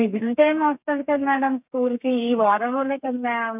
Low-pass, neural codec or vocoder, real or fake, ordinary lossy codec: 3.6 kHz; codec, 32 kHz, 1.9 kbps, SNAC; fake; none